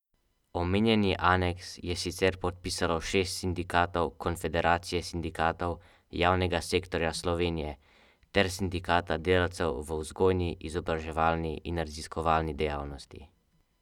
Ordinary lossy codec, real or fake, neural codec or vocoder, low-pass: none; real; none; 19.8 kHz